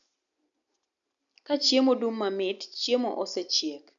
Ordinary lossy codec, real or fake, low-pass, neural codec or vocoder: none; real; 7.2 kHz; none